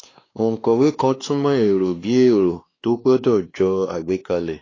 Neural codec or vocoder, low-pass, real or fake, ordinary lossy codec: codec, 16 kHz, 2 kbps, X-Codec, WavLM features, trained on Multilingual LibriSpeech; 7.2 kHz; fake; AAC, 32 kbps